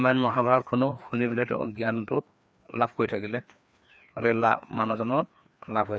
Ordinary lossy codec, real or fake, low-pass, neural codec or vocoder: none; fake; none; codec, 16 kHz, 2 kbps, FreqCodec, larger model